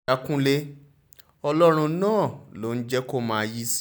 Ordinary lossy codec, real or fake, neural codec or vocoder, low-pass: none; real; none; none